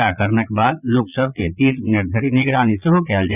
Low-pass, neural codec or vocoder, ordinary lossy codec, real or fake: 3.6 kHz; vocoder, 22.05 kHz, 80 mel bands, Vocos; none; fake